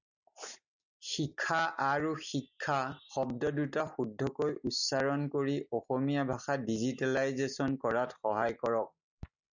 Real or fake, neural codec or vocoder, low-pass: real; none; 7.2 kHz